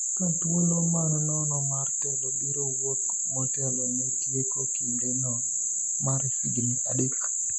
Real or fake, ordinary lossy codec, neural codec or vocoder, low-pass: real; none; none; none